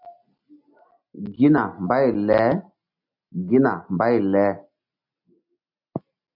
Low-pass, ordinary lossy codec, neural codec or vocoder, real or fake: 5.4 kHz; MP3, 48 kbps; none; real